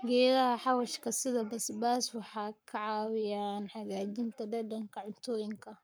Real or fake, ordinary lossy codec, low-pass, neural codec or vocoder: fake; none; none; codec, 44.1 kHz, 7.8 kbps, Pupu-Codec